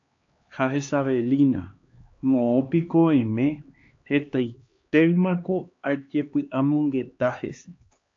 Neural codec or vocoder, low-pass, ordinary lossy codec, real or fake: codec, 16 kHz, 2 kbps, X-Codec, HuBERT features, trained on LibriSpeech; 7.2 kHz; AAC, 48 kbps; fake